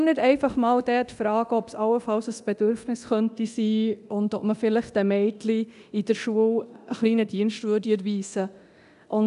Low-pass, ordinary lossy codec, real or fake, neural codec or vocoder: 10.8 kHz; AAC, 96 kbps; fake; codec, 24 kHz, 0.9 kbps, DualCodec